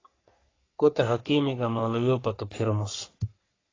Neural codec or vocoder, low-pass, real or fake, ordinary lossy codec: codec, 44.1 kHz, 3.4 kbps, Pupu-Codec; 7.2 kHz; fake; AAC, 32 kbps